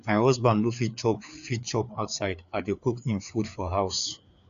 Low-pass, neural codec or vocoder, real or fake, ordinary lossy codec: 7.2 kHz; codec, 16 kHz, 4 kbps, FreqCodec, larger model; fake; none